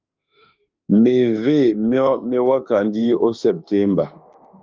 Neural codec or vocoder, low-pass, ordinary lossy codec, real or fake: autoencoder, 48 kHz, 32 numbers a frame, DAC-VAE, trained on Japanese speech; 7.2 kHz; Opus, 32 kbps; fake